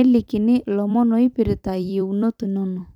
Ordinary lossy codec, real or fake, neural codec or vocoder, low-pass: none; fake; vocoder, 44.1 kHz, 128 mel bands every 256 samples, BigVGAN v2; 19.8 kHz